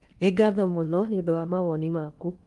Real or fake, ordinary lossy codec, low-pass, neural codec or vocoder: fake; Opus, 32 kbps; 10.8 kHz; codec, 16 kHz in and 24 kHz out, 0.8 kbps, FocalCodec, streaming, 65536 codes